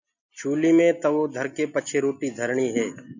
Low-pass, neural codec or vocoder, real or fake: 7.2 kHz; none; real